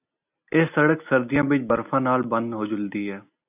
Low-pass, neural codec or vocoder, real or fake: 3.6 kHz; none; real